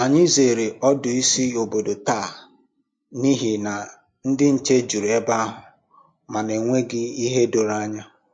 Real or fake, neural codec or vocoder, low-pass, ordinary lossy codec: real; none; 9.9 kHz; MP3, 48 kbps